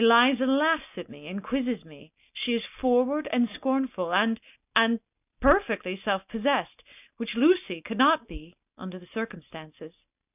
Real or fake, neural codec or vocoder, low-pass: real; none; 3.6 kHz